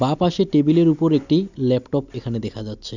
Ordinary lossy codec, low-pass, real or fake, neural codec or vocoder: none; 7.2 kHz; real; none